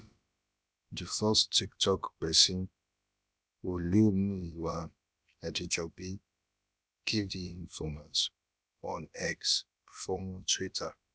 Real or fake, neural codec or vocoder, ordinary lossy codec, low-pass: fake; codec, 16 kHz, about 1 kbps, DyCAST, with the encoder's durations; none; none